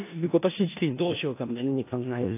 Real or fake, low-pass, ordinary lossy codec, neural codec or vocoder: fake; 3.6 kHz; AAC, 24 kbps; codec, 16 kHz in and 24 kHz out, 0.4 kbps, LongCat-Audio-Codec, four codebook decoder